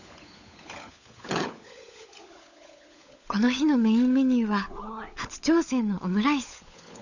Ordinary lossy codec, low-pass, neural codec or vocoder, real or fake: none; 7.2 kHz; codec, 16 kHz, 16 kbps, FunCodec, trained on LibriTTS, 50 frames a second; fake